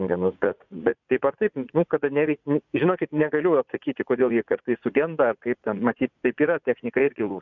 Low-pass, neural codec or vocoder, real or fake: 7.2 kHz; vocoder, 44.1 kHz, 80 mel bands, Vocos; fake